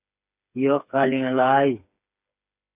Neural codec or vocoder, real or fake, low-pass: codec, 16 kHz, 4 kbps, FreqCodec, smaller model; fake; 3.6 kHz